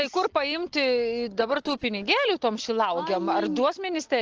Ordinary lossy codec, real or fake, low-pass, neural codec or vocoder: Opus, 16 kbps; real; 7.2 kHz; none